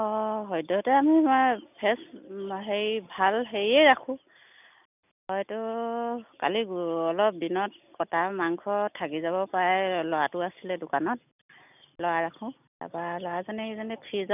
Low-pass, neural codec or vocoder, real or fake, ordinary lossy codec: 3.6 kHz; none; real; none